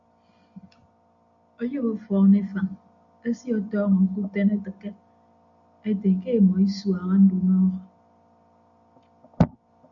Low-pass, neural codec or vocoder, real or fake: 7.2 kHz; none; real